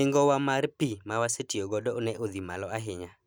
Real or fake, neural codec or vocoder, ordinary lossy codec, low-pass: real; none; none; none